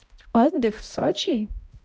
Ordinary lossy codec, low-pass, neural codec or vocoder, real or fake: none; none; codec, 16 kHz, 0.5 kbps, X-Codec, HuBERT features, trained on balanced general audio; fake